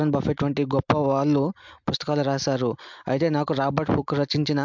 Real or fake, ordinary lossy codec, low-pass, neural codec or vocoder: real; MP3, 64 kbps; 7.2 kHz; none